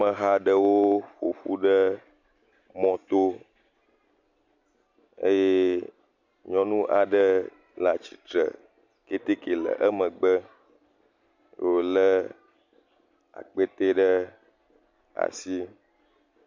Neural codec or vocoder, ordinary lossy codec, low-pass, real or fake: none; MP3, 64 kbps; 7.2 kHz; real